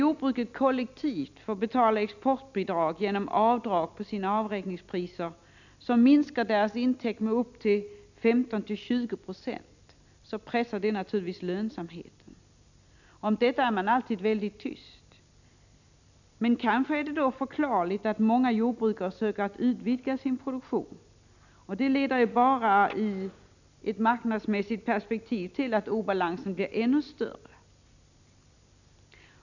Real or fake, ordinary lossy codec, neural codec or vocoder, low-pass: real; none; none; 7.2 kHz